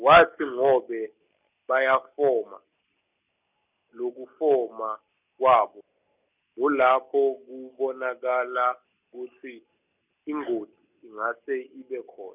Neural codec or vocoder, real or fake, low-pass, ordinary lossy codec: none; real; 3.6 kHz; none